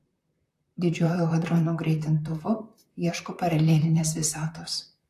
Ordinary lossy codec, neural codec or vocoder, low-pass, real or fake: AAC, 64 kbps; vocoder, 44.1 kHz, 128 mel bands, Pupu-Vocoder; 14.4 kHz; fake